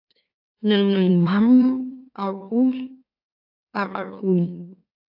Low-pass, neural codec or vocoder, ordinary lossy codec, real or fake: 5.4 kHz; autoencoder, 44.1 kHz, a latent of 192 numbers a frame, MeloTTS; AAC, 32 kbps; fake